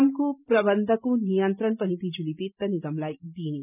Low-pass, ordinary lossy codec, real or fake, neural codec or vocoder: 3.6 kHz; none; real; none